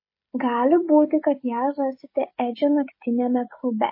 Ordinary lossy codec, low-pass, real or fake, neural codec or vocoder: MP3, 24 kbps; 5.4 kHz; fake; codec, 16 kHz, 16 kbps, FreqCodec, smaller model